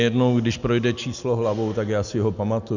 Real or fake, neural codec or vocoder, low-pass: real; none; 7.2 kHz